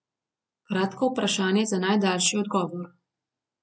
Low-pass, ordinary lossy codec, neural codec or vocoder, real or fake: none; none; none; real